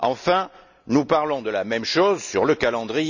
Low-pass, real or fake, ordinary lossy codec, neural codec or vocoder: 7.2 kHz; real; none; none